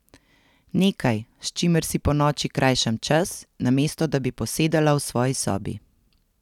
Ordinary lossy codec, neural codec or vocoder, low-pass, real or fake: none; none; 19.8 kHz; real